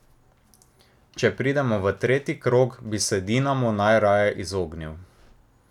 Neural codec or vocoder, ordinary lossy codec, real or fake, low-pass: none; none; real; 19.8 kHz